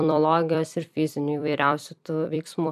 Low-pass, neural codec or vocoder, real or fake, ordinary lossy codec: 14.4 kHz; vocoder, 44.1 kHz, 128 mel bands every 256 samples, BigVGAN v2; fake; MP3, 96 kbps